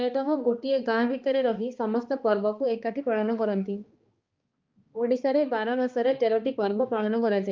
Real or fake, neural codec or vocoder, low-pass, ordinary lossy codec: fake; codec, 16 kHz, 2 kbps, X-Codec, HuBERT features, trained on balanced general audio; 7.2 kHz; Opus, 24 kbps